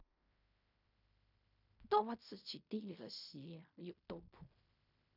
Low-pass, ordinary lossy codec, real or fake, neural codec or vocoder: 5.4 kHz; none; fake; codec, 16 kHz in and 24 kHz out, 0.4 kbps, LongCat-Audio-Codec, fine tuned four codebook decoder